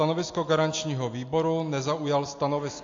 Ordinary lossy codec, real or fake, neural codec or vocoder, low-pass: AAC, 48 kbps; real; none; 7.2 kHz